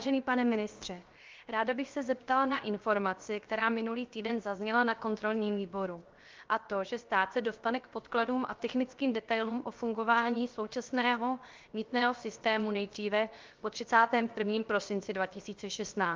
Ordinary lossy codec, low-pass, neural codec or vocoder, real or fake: Opus, 32 kbps; 7.2 kHz; codec, 16 kHz, 0.7 kbps, FocalCodec; fake